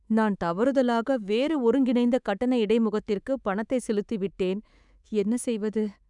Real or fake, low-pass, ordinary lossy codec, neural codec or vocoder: fake; 10.8 kHz; none; codec, 24 kHz, 3.1 kbps, DualCodec